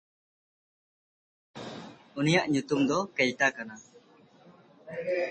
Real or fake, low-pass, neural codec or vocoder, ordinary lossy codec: real; 10.8 kHz; none; MP3, 32 kbps